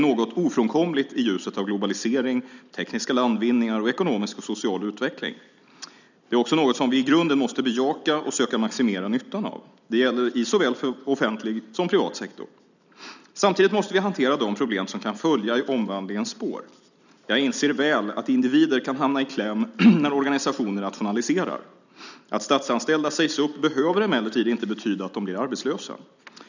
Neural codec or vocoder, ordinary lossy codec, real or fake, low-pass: none; none; real; 7.2 kHz